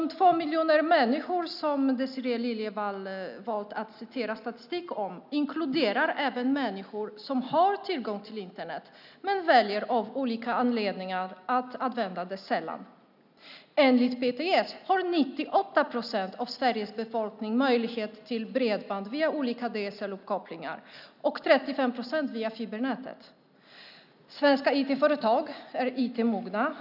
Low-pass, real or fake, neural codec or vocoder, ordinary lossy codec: 5.4 kHz; real; none; none